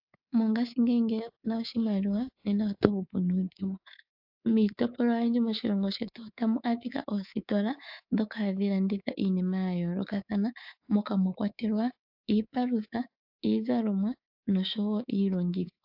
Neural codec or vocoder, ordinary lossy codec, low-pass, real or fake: codec, 24 kHz, 3.1 kbps, DualCodec; AAC, 48 kbps; 5.4 kHz; fake